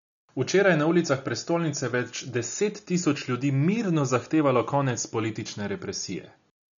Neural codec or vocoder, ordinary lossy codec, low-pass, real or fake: none; none; 7.2 kHz; real